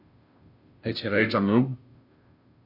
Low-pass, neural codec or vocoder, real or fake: 5.4 kHz; codec, 16 kHz, 0.5 kbps, FunCodec, trained on Chinese and English, 25 frames a second; fake